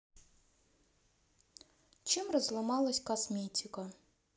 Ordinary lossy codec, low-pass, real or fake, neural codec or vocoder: none; none; real; none